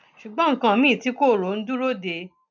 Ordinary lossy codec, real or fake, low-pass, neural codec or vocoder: none; real; 7.2 kHz; none